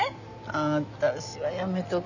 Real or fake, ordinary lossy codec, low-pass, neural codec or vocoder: real; none; 7.2 kHz; none